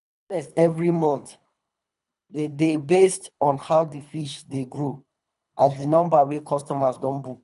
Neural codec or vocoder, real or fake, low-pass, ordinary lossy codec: codec, 24 kHz, 3 kbps, HILCodec; fake; 10.8 kHz; none